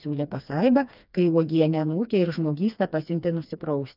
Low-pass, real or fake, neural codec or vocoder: 5.4 kHz; fake; codec, 16 kHz, 2 kbps, FreqCodec, smaller model